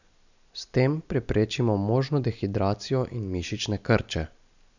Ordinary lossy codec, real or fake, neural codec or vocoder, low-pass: none; real; none; 7.2 kHz